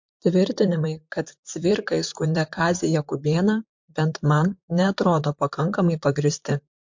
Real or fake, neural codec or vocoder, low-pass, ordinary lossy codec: fake; vocoder, 44.1 kHz, 128 mel bands, Pupu-Vocoder; 7.2 kHz; MP3, 48 kbps